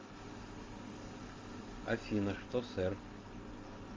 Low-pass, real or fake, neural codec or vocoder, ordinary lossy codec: 7.2 kHz; real; none; Opus, 32 kbps